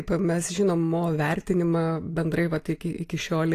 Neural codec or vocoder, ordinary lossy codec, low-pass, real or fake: none; AAC, 48 kbps; 14.4 kHz; real